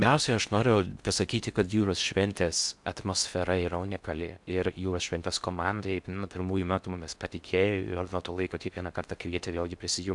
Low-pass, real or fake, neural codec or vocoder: 10.8 kHz; fake; codec, 16 kHz in and 24 kHz out, 0.6 kbps, FocalCodec, streaming, 4096 codes